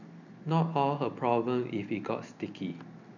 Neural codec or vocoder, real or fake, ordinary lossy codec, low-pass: none; real; none; 7.2 kHz